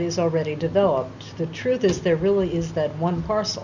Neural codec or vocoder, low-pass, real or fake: none; 7.2 kHz; real